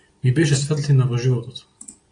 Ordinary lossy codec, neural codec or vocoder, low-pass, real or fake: AAC, 48 kbps; none; 9.9 kHz; real